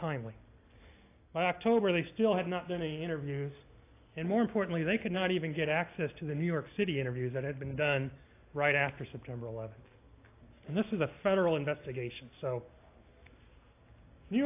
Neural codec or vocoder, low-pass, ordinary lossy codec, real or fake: none; 3.6 kHz; AAC, 24 kbps; real